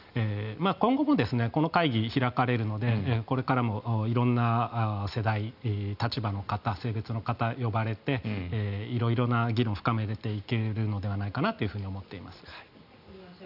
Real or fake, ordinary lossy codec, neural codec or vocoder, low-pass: real; none; none; 5.4 kHz